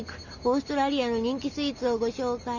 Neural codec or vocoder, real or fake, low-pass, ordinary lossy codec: none; real; 7.2 kHz; none